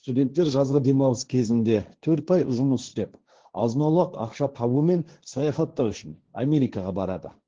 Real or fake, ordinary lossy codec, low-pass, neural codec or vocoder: fake; Opus, 16 kbps; 7.2 kHz; codec, 16 kHz, 1.1 kbps, Voila-Tokenizer